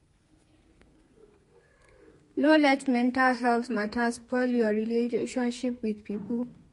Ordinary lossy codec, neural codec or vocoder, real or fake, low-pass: MP3, 48 kbps; codec, 32 kHz, 1.9 kbps, SNAC; fake; 14.4 kHz